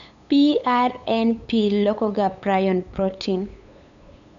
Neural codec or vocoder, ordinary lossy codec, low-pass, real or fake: codec, 16 kHz, 8 kbps, FunCodec, trained on LibriTTS, 25 frames a second; none; 7.2 kHz; fake